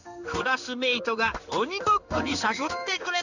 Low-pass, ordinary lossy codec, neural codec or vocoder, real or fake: 7.2 kHz; none; codec, 16 kHz in and 24 kHz out, 1 kbps, XY-Tokenizer; fake